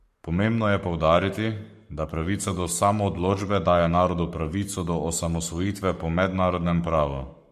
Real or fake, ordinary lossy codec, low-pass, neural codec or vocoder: fake; MP3, 64 kbps; 14.4 kHz; codec, 44.1 kHz, 7.8 kbps, Pupu-Codec